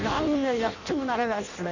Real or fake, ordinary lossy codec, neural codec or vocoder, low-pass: fake; none; codec, 16 kHz in and 24 kHz out, 0.6 kbps, FireRedTTS-2 codec; 7.2 kHz